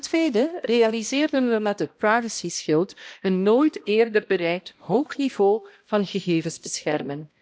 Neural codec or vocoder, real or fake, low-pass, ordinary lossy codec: codec, 16 kHz, 1 kbps, X-Codec, HuBERT features, trained on balanced general audio; fake; none; none